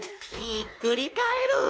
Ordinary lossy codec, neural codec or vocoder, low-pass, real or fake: none; codec, 16 kHz, 2 kbps, X-Codec, WavLM features, trained on Multilingual LibriSpeech; none; fake